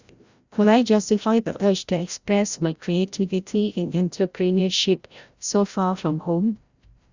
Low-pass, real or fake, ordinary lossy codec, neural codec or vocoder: 7.2 kHz; fake; Opus, 64 kbps; codec, 16 kHz, 0.5 kbps, FreqCodec, larger model